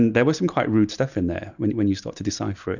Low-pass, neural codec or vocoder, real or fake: 7.2 kHz; none; real